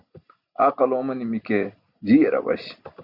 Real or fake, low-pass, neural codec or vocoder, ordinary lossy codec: real; 5.4 kHz; none; Opus, 64 kbps